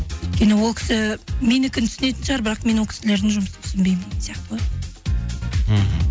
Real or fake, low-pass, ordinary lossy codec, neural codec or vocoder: real; none; none; none